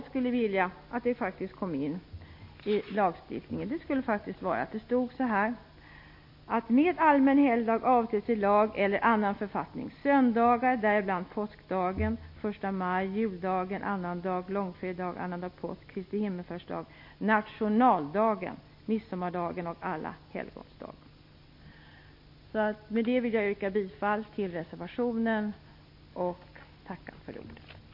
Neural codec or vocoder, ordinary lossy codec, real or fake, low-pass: none; MP3, 32 kbps; real; 5.4 kHz